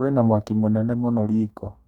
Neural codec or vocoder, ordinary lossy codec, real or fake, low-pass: codec, 44.1 kHz, 2.6 kbps, DAC; none; fake; 19.8 kHz